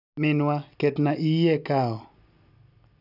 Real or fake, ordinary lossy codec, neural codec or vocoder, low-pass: real; none; none; 5.4 kHz